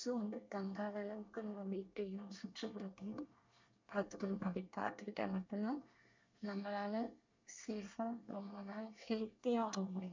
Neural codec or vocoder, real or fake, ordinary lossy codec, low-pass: codec, 24 kHz, 1 kbps, SNAC; fake; MP3, 64 kbps; 7.2 kHz